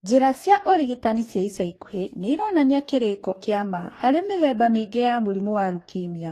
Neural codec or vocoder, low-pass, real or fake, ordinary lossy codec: codec, 44.1 kHz, 2.6 kbps, DAC; 14.4 kHz; fake; AAC, 48 kbps